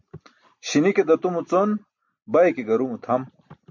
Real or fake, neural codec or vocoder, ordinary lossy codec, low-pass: real; none; MP3, 64 kbps; 7.2 kHz